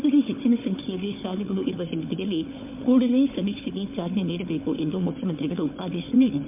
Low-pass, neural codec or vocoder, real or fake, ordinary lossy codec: 3.6 kHz; codec, 16 kHz, 4 kbps, FunCodec, trained on Chinese and English, 50 frames a second; fake; MP3, 32 kbps